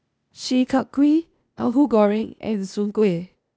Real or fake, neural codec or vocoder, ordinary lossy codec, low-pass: fake; codec, 16 kHz, 0.8 kbps, ZipCodec; none; none